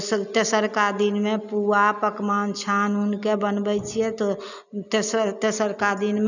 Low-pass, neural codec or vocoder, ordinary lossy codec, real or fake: 7.2 kHz; none; none; real